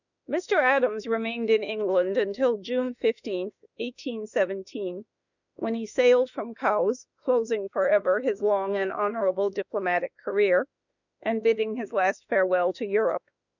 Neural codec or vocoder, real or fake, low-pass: autoencoder, 48 kHz, 32 numbers a frame, DAC-VAE, trained on Japanese speech; fake; 7.2 kHz